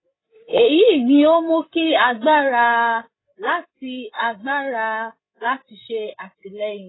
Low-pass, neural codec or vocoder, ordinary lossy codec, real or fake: 7.2 kHz; codec, 16 kHz, 8 kbps, FreqCodec, larger model; AAC, 16 kbps; fake